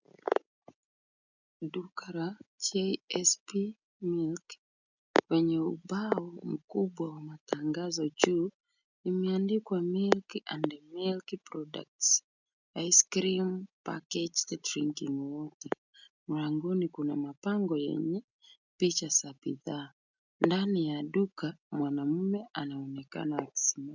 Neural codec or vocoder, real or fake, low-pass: none; real; 7.2 kHz